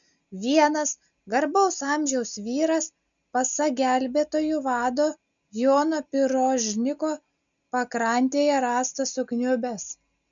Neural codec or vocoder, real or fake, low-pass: none; real; 7.2 kHz